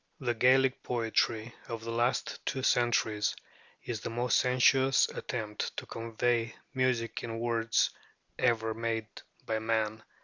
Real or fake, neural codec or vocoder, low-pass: real; none; 7.2 kHz